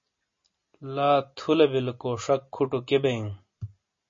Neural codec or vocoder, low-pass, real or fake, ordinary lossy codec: none; 7.2 kHz; real; MP3, 32 kbps